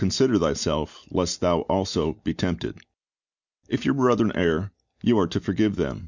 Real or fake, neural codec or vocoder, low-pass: real; none; 7.2 kHz